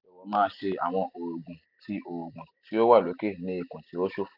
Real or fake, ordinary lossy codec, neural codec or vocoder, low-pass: real; none; none; 5.4 kHz